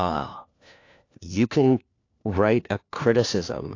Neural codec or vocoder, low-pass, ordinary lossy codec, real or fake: codec, 16 kHz, 1 kbps, FunCodec, trained on LibriTTS, 50 frames a second; 7.2 kHz; AAC, 48 kbps; fake